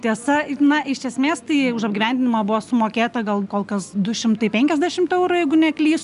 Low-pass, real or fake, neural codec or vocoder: 10.8 kHz; real; none